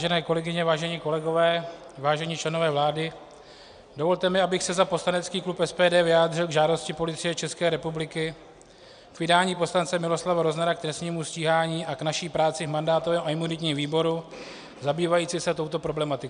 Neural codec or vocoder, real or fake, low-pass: none; real; 9.9 kHz